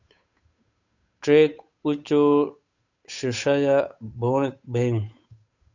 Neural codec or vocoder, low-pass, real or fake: codec, 16 kHz, 8 kbps, FunCodec, trained on Chinese and English, 25 frames a second; 7.2 kHz; fake